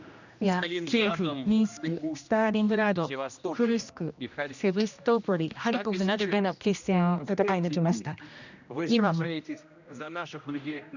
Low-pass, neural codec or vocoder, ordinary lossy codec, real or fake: 7.2 kHz; codec, 16 kHz, 1 kbps, X-Codec, HuBERT features, trained on general audio; none; fake